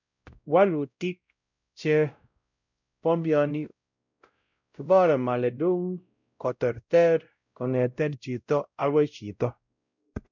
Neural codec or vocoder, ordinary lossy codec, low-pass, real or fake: codec, 16 kHz, 0.5 kbps, X-Codec, WavLM features, trained on Multilingual LibriSpeech; none; 7.2 kHz; fake